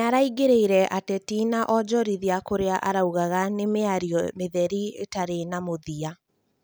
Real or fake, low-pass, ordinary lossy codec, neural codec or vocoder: real; none; none; none